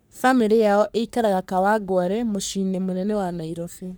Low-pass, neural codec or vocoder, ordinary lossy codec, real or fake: none; codec, 44.1 kHz, 3.4 kbps, Pupu-Codec; none; fake